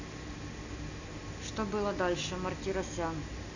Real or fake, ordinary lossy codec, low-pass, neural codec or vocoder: real; none; 7.2 kHz; none